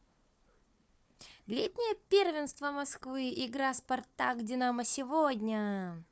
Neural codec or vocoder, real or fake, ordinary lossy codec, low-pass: codec, 16 kHz, 4 kbps, FunCodec, trained on Chinese and English, 50 frames a second; fake; none; none